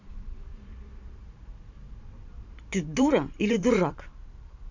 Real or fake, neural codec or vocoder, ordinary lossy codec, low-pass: fake; vocoder, 44.1 kHz, 128 mel bands every 512 samples, BigVGAN v2; none; 7.2 kHz